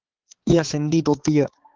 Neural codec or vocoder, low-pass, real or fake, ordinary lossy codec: codec, 16 kHz, 4 kbps, X-Codec, HuBERT features, trained on balanced general audio; 7.2 kHz; fake; Opus, 16 kbps